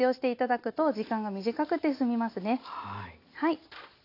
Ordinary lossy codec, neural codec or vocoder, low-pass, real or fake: AAC, 32 kbps; none; 5.4 kHz; real